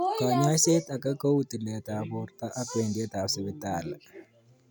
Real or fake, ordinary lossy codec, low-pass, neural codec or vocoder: real; none; none; none